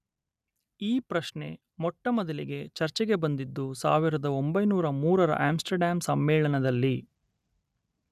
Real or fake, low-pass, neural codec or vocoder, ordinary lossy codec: real; 14.4 kHz; none; none